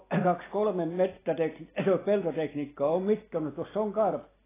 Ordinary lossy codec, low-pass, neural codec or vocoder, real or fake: AAC, 16 kbps; 3.6 kHz; none; real